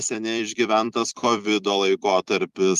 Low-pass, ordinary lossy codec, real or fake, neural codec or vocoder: 14.4 kHz; Opus, 32 kbps; fake; vocoder, 44.1 kHz, 128 mel bands every 256 samples, BigVGAN v2